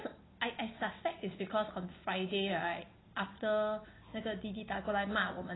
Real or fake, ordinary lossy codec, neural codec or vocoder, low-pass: real; AAC, 16 kbps; none; 7.2 kHz